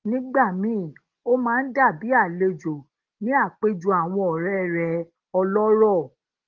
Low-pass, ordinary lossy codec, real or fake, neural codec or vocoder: 7.2 kHz; Opus, 16 kbps; real; none